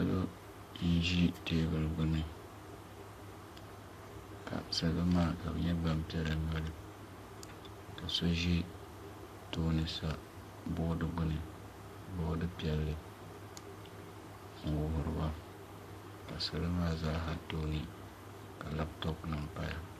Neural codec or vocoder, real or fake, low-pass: codec, 44.1 kHz, 7.8 kbps, Pupu-Codec; fake; 14.4 kHz